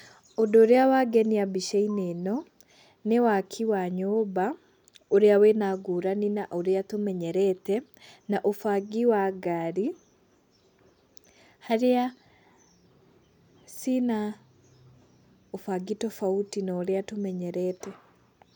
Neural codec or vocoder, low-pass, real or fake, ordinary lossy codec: none; 19.8 kHz; real; none